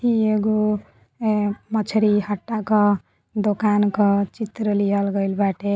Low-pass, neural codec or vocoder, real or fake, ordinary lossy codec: none; none; real; none